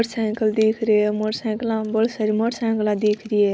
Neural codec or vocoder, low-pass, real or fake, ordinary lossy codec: none; none; real; none